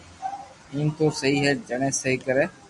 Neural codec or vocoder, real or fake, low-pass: none; real; 10.8 kHz